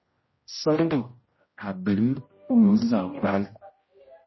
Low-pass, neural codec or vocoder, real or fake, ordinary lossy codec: 7.2 kHz; codec, 16 kHz, 0.5 kbps, X-Codec, HuBERT features, trained on general audio; fake; MP3, 24 kbps